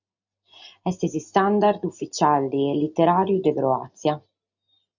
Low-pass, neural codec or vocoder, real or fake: 7.2 kHz; none; real